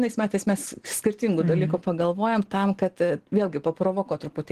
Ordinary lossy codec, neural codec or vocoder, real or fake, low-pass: Opus, 16 kbps; none; real; 14.4 kHz